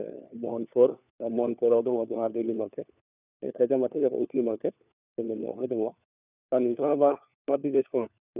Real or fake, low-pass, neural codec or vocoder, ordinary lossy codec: fake; 3.6 kHz; codec, 16 kHz, 4 kbps, FunCodec, trained on LibriTTS, 50 frames a second; none